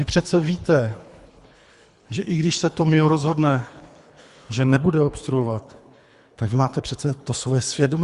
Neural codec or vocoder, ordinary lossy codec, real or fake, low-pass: codec, 24 kHz, 3 kbps, HILCodec; Opus, 64 kbps; fake; 10.8 kHz